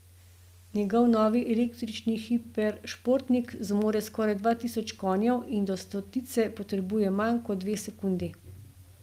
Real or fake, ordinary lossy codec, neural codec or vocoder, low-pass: real; Opus, 32 kbps; none; 14.4 kHz